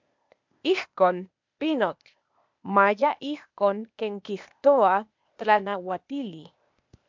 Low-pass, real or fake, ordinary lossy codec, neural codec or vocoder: 7.2 kHz; fake; MP3, 64 kbps; codec, 16 kHz, 0.8 kbps, ZipCodec